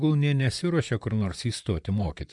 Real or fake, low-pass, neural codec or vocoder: fake; 10.8 kHz; vocoder, 44.1 kHz, 128 mel bands, Pupu-Vocoder